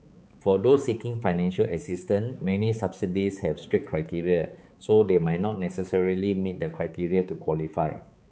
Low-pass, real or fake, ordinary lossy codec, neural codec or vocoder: none; fake; none; codec, 16 kHz, 4 kbps, X-Codec, HuBERT features, trained on balanced general audio